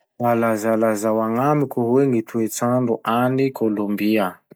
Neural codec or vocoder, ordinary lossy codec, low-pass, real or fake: none; none; none; real